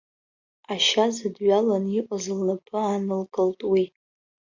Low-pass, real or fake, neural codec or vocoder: 7.2 kHz; real; none